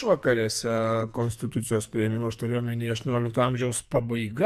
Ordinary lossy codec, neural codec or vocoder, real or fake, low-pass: AAC, 96 kbps; codec, 44.1 kHz, 2.6 kbps, SNAC; fake; 14.4 kHz